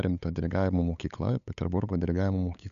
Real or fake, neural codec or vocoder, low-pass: fake; codec, 16 kHz, 8 kbps, FunCodec, trained on LibriTTS, 25 frames a second; 7.2 kHz